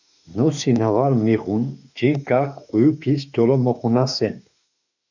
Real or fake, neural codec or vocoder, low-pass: fake; autoencoder, 48 kHz, 32 numbers a frame, DAC-VAE, trained on Japanese speech; 7.2 kHz